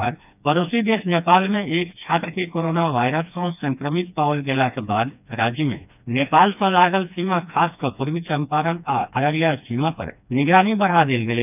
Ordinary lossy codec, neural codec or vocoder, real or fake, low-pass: none; codec, 16 kHz, 2 kbps, FreqCodec, smaller model; fake; 3.6 kHz